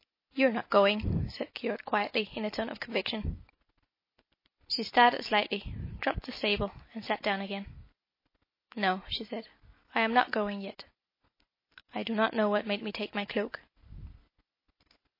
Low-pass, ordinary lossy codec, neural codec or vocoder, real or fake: 5.4 kHz; MP3, 24 kbps; none; real